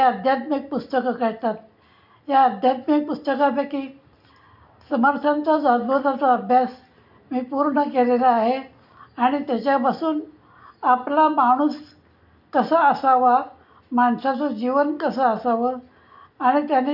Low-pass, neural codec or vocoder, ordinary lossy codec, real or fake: 5.4 kHz; none; none; real